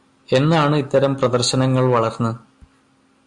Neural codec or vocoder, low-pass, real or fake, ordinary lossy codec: none; 10.8 kHz; real; Opus, 64 kbps